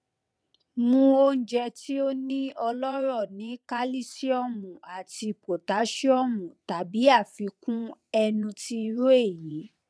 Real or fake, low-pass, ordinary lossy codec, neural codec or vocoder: fake; none; none; vocoder, 22.05 kHz, 80 mel bands, WaveNeXt